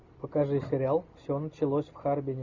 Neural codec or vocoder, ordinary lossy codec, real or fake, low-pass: none; Opus, 64 kbps; real; 7.2 kHz